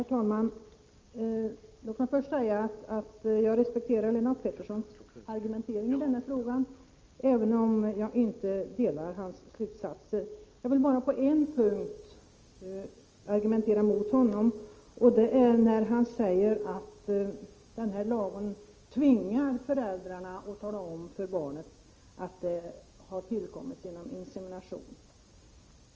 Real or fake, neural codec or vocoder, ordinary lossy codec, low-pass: real; none; Opus, 24 kbps; 7.2 kHz